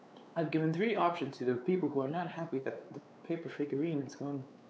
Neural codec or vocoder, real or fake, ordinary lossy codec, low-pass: codec, 16 kHz, 4 kbps, X-Codec, WavLM features, trained on Multilingual LibriSpeech; fake; none; none